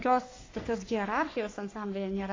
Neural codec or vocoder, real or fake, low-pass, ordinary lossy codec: codec, 44.1 kHz, 3.4 kbps, Pupu-Codec; fake; 7.2 kHz; AAC, 32 kbps